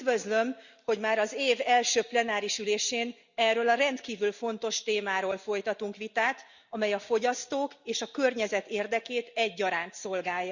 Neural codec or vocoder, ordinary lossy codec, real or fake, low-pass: none; Opus, 64 kbps; real; 7.2 kHz